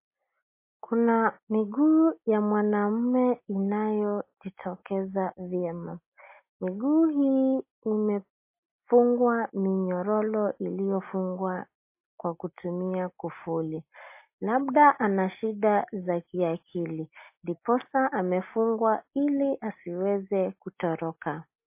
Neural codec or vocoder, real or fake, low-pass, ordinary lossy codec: none; real; 3.6 kHz; MP3, 24 kbps